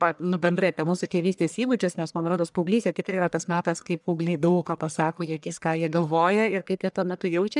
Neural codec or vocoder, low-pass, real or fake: codec, 44.1 kHz, 1.7 kbps, Pupu-Codec; 10.8 kHz; fake